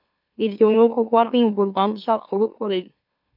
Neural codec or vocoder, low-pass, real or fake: autoencoder, 44.1 kHz, a latent of 192 numbers a frame, MeloTTS; 5.4 kHz; fake